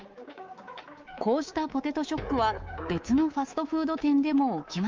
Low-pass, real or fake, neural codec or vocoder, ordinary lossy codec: 7.2 kHz; fake; codec, 24 kHz, 3.1 kbps, DualCodec; Opus, 24 kbps